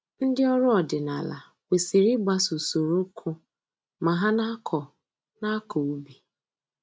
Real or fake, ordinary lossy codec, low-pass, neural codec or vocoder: real; none; none; none